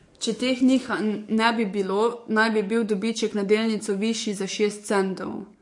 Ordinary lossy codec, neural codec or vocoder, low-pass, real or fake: MP3, 48 kbps; codec, 44.1 kHz, 7.8 kbps, DAC; 10.8 kHz; fake